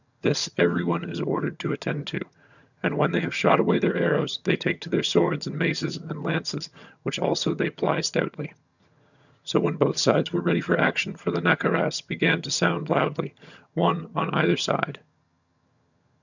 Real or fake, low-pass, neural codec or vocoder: fake; 7.2 kHz; vocoder, 22.05 kHz, 80 mel bands, HiFi-GAN